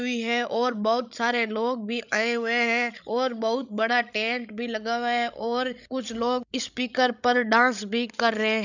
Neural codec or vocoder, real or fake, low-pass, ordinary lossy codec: codec, 16 kHz, 16 kbps, FreqCodec, larger model; fake; 7.2 kHz; none